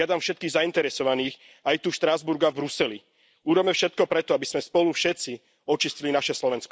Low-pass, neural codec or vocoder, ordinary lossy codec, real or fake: none; none; none; real